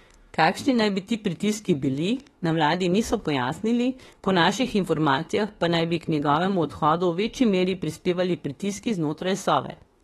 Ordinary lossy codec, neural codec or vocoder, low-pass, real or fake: AAC, 32 kbps; autoencoder, 48 kHz, 32 numbers a frame, DAC-VAE, trained on Japanese speech; 19.8 kHz; fake